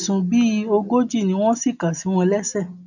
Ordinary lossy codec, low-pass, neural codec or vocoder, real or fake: none; 7.2 kHz; none; real